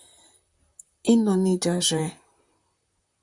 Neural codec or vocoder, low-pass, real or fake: vocoder, 44.1 kHz, 128 mel bands, Pupu-Vocoder; 10.8 kHz; fake